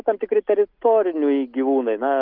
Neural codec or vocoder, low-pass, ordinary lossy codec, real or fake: none; 5.4 kHz; Opus, 24 kbps; real